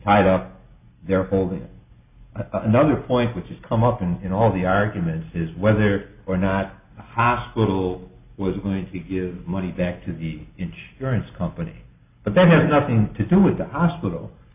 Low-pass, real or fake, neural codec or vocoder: 3.6 kHz; real; none